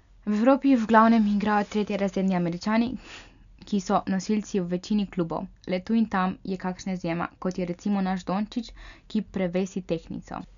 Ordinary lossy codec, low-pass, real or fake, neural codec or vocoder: none; 7.2 kHz; real; none